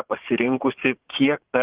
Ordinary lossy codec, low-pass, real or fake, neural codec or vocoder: Opus, 16 kbps; 3.6 kHz; fake; vocoder, 44.1 kHz, 80 mel bands, Vocos